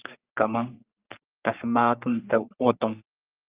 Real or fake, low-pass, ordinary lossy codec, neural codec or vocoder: fake; 3.6 kHz; Opus, 32 kbps; codec, 44.1 kHz, 3.4 kbps, Pupu-Codec